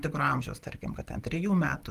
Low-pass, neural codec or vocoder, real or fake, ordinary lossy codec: 14.4 kHz; none; real; Opus, 24 kbps